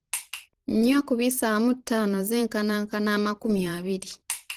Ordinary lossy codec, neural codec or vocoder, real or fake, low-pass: Opus, 16 kbps; vocoder, 44.1 kHz, 128 mel bands every 512 samples, BigVGAN v2; fake; 14.4 kHz